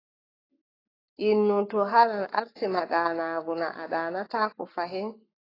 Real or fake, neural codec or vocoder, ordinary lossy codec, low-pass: fake; codec, 44.1 kHz, 7.8 kbps, Pupu-Codec; AAC, 24 kbps; 5.4 kHz